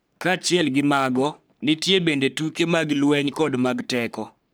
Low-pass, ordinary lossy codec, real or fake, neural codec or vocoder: none; none; fake; codec, 44.1 kHz, 3.4 kbps, Pupu-Codec